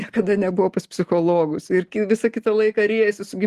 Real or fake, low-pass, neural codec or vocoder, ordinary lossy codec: real; 14.4 kHz; none; Opus, 24 kbps